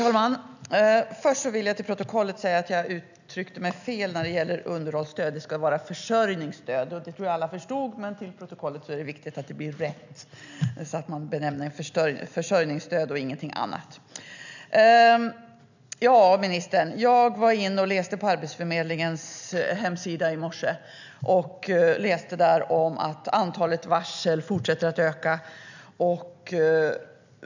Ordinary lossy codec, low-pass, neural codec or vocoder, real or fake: none; 7.2 kHz; none; real